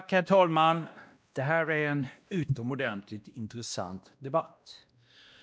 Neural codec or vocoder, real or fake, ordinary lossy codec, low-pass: codec, 16 kHz, 1 kbps, X-Codec, WavLM features, trained on Multilingual LibriSpeech; fake; none; none